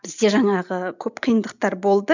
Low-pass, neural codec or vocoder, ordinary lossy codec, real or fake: 7.2 kHz; none; none; real